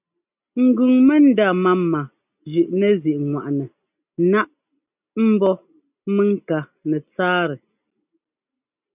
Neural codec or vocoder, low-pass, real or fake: none; 3.6 kHz; real